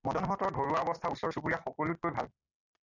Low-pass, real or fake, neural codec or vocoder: 7.2 kHz; real; none